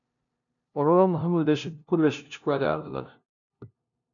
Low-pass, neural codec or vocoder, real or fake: 7.2 kHz; codec, 16 kHz, 0.5 kbps, FunCodec, trained on LibriTTS, 25 frames a second; fake